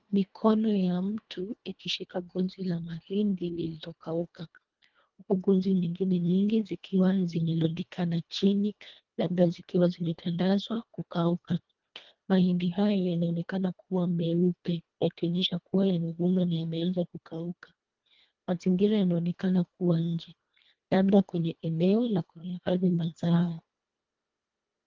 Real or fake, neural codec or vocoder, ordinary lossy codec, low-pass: fake; codec, 24 kHz, 1.5 kbps, HILCodec; Opus, 32 kbps; 7.2 kHz